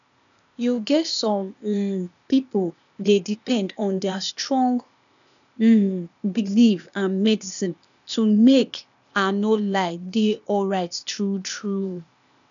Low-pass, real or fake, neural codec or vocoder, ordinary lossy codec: 7.2 kHz; fake; codec, 16 kHz, 0.8 kbps, ZipCodec; none